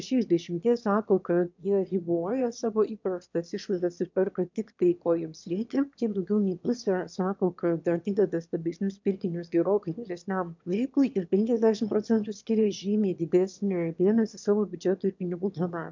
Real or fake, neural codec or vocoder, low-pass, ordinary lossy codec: fake; autoencoder, 22.05 kHz, a latent of 192 numbers a frame, VITS, trained on one speaker; 7.2 kHz; MP3, 64 kbps